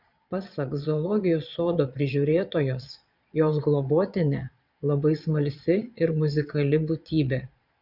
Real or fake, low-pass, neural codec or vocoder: fake; 5.4 kHz; vocoder, 22.05 kHz, 80 mel bands, Vocos